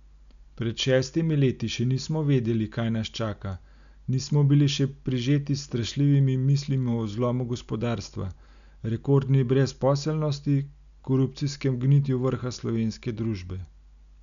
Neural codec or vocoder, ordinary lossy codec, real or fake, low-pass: none; none; real; 7.2 kHz